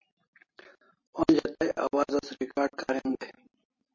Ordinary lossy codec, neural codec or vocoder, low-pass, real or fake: MP3, 32 kbps; none; 7.2 kHz; real